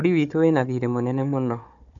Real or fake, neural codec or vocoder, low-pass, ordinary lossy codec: fake; codec, 16 kHz, 4 kbps, FunCodec, trained on Chinese and English, 50 frames a second; 7.2 kHz; none